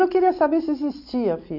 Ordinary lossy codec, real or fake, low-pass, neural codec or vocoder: none; real; 5.4 kHz; none